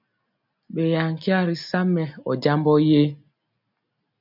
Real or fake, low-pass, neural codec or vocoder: real; 5.4 kHz; none